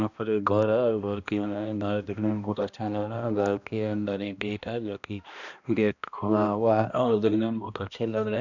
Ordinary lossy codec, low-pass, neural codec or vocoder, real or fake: none; 7.2 kHz; codec, 16 kHz, 1 kbps, X-Codec, HuBERT features, trained on balanced general audio; fake